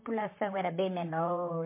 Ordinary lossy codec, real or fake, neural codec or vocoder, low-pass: MP3, 24 kbps; fake; vocoder, 44.1 kHz, 128 mel bands, Pupu-Vocoder; 3.6 kHz